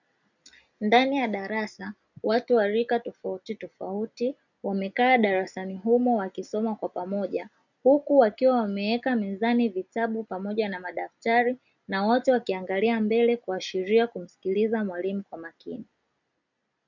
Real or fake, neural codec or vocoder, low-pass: real; none; 7.2 kHz